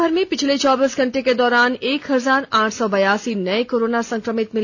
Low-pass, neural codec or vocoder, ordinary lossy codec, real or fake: 7.2 kHz; none; none; real